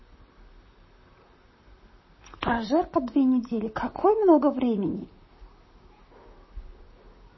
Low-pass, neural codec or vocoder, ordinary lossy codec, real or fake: 7.2 kHz; vocoder, 44.1 kHz, 128 mel bands, Pupu-Vocoder; MP3, 24 kbps; fake